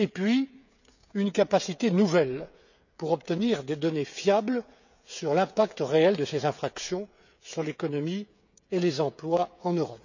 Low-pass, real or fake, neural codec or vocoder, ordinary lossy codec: 7.2 kHz; fake; codec, 16 kHz, 16 kbps, FreqCodec, smaller model; none